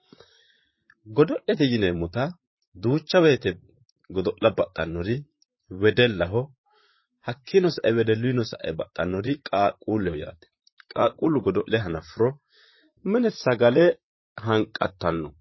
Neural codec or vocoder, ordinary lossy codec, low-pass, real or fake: vocoder, 44.1 kHz, 128 mel bands, Pupu-Vocoder; MP3, 24 kbps; 7.2 kHz; fake